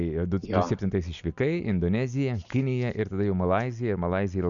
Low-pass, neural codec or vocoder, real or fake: 7.2 kHz; none; real